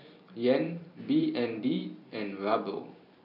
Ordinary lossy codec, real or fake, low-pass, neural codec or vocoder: none; fake; 5.4 kHz; vocoder, 44.1 kHz, 128 mel bands every 512 samples, BigVGAN v2